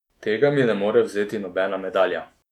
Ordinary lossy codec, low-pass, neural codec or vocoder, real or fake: none; 19.8 kHz; autoencoder, 48 kHz, 128 numbers a frame, DAC-VAE, trained on Japanese speech; fake